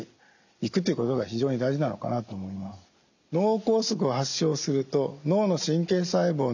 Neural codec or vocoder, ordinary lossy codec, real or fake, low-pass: none; none; real; 7.2 kHz